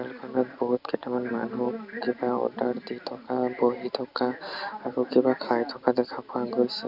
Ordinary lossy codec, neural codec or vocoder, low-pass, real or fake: none; none; 5.4 kHz; real